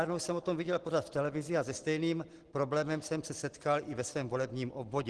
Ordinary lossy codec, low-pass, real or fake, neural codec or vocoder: Opus, 16 kbps; 10.8 kHz; real; none